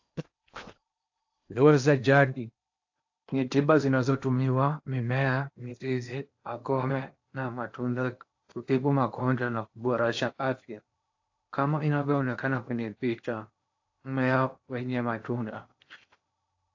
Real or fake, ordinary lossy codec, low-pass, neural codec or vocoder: fake; AAC, 48 kbps; 7.2 kHz; codec, 16 kHz in and 24 kHz out, 0.8 kbps, FocalCodec, streaming, 65536 codes